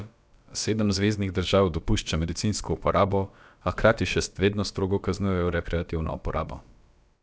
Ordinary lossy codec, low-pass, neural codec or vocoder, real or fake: none; none; codec, 16 kHz, about 1 kbps, DyCAST, with the encoder's durations; fake